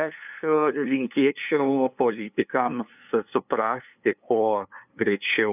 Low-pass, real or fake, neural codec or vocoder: 3.6 kHz; fake; codec, 16 kHz, 2 kbps, FunCodec, trained on LibriTTS, 25 frames a second